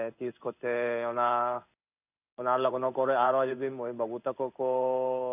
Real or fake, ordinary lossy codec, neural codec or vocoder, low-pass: fake; none; codec, 16 kHz in and 24 kHz out, 1 kbps, XY-Tokenizer; 3.6 kHz